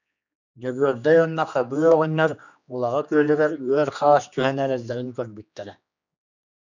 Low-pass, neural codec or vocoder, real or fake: 7.2 kHz; codec, 16 kHz, 2 kbps, X-Codec, HuBERT features, trained on general audio; fake